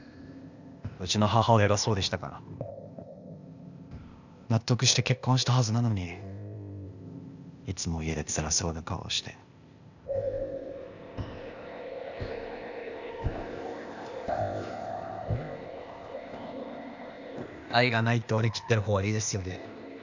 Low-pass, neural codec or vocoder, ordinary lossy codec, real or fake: 7.2 kHz; codec, 16 kHz, 0.8 kbps, ZipCodec; none; fake